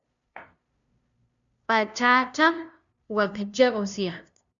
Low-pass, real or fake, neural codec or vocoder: 7.2 kHz; fake; codec, 16 kHz, 0.5 kbps, FunCodec, trained on LibriTTS, 25 frames a second